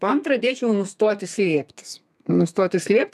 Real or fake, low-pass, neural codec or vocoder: fake; 14.4 kHz; codec, 32 kHz, 1.9 kbps, SNAC